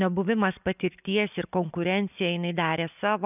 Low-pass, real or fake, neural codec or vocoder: 3.6 kHz; real; none